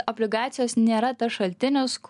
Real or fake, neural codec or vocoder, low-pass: real; none; 10.8 kHz